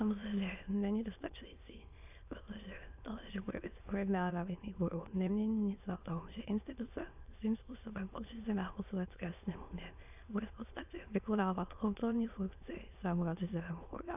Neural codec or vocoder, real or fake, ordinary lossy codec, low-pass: autoencoder, 22.05 kHz, a latent of 192 numbers a frame, VITS, trained on many speakers; fake; AAC, 32 kbps; 3.6 kHz